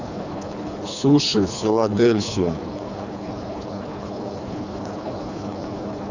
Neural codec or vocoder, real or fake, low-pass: codec, 24 kHz, 3 kbps, HILCodec; fake; 7.2 kHz